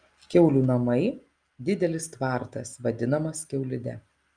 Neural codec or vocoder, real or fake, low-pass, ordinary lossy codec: none; real; 9.9 kHz; Opus, 32 kbps